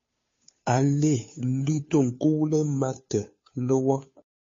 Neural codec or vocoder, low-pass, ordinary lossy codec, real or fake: codec, 16 kHz, 2 kbps, FunCodec, trained on Chinese and English, 25 frames a second; 7.2 kHz; MP3, 32 kbps; fake